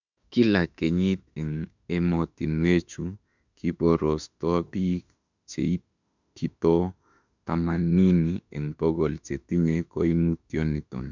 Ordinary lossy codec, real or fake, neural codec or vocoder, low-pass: none; fake; autoencoder, 48 kHz, 32 numbers a frame, DAC-VAE, trained on Japanese speech; 7.2 kHz